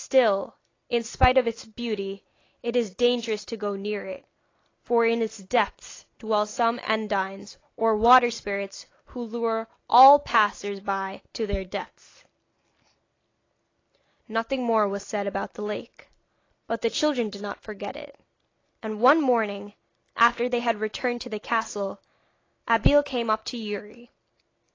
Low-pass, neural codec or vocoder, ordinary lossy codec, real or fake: 7.2 kHz; none; AAC, 32 kbps; real